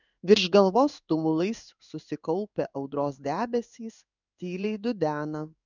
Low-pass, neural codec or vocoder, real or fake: 7.2 kHz; codec, 16 kHz in and 24 kHz out, 1 kbps, XY-Tokenizer; fake